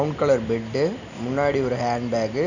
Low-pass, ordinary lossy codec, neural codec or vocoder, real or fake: 7.2 kHz; none; none; real